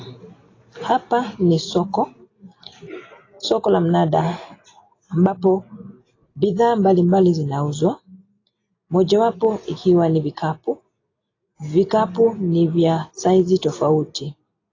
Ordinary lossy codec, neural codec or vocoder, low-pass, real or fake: AAC, 32 kbps; none; 7.2 kHz; real